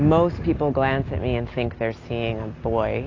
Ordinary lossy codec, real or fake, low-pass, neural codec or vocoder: AAC, 32 kbps; real; 7.2 kHz; none